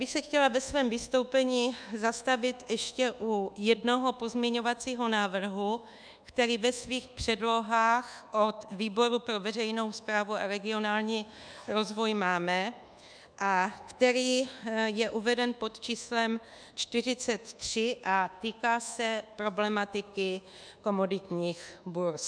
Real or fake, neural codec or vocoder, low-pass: fake; codec, 24 kHz, 1.2 kbps, DualCodec; 9.9 kHz